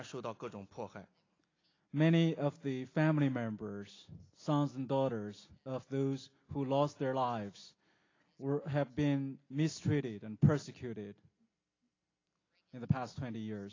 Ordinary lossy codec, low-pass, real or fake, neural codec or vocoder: AAC, 32 kbps; 7.2 kHz; real; none